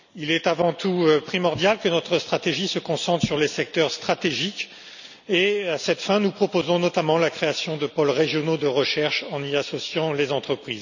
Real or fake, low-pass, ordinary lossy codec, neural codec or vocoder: real; 7.2 kHz; none; none